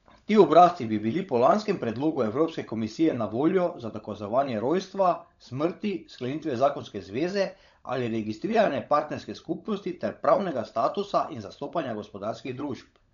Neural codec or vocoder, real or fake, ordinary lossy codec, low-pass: codec, 16 kHz, 16 kbps, FunCodec, trained on LibriTTS, 50 frames a second; fake; none; 7.2 kHz